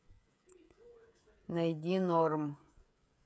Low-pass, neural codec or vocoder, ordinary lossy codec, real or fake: none; codec, 16 kHz, 16 kbps, FreqCodec, smaller model; none; fake